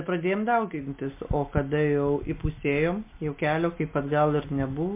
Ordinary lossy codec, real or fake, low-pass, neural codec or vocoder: MP3, 24 kbps; real; 3.6 kHz; none